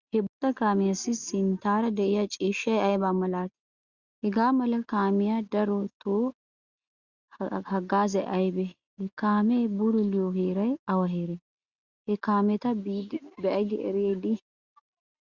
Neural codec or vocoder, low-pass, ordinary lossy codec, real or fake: none; 7.2 kHz; Opus, 64 kbps; real